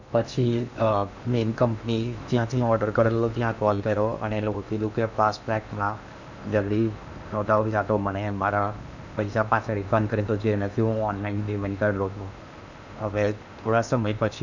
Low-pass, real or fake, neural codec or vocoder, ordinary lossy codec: 7.2 kHz; fake; codec, 16 kHz in and 24 kHz out, 0.8 kbps, FocalCodec, streaming, 65536 codes; none